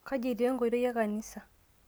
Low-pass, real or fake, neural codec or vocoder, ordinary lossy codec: none; real; none; none